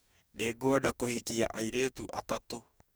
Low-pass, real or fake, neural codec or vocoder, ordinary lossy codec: none; fake; codec, 44.1 kHz, 2.6 kbps, DAC; none